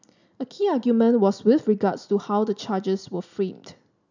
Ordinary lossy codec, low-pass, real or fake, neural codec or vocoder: none; 7.2 kHz; real; none